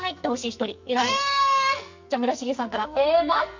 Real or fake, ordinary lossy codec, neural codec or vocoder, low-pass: fake; MP3, 64 kbps; codec, 44.1 kHz, 2.6 kbps, SNAC; 7.2 kHz